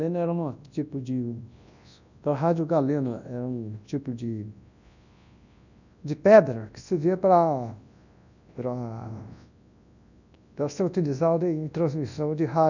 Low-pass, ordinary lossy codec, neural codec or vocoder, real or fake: 7.2 kHz; none; codec, 24 kHz, 0.9 kbps, WavTokenizer, large speech release; fake